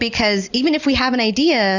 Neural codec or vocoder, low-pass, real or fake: none; 7.2 kHz; real